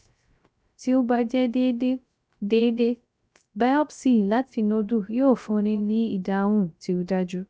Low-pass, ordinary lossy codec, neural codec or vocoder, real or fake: none; none; codec, 16 kHz, 0.3 kbps, FocalCodec; fake